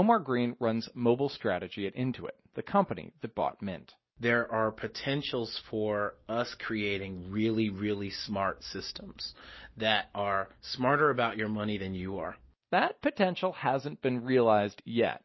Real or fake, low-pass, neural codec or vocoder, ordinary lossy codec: real; 7.2 kHz; none; MP3, 24 kbps